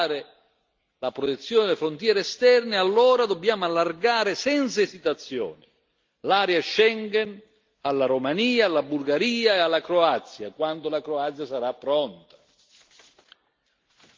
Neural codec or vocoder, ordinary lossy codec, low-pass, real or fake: none; Opus, 32 kbps; 7.2 kHz; real